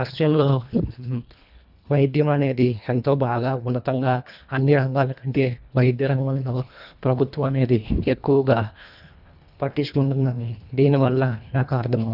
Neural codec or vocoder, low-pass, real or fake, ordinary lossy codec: codec, 24 kHz, 1.5 kbps, HILCodec; 5.4 kHz; fake; none